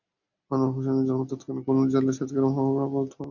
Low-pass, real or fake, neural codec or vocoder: 7.2 kHz; real; none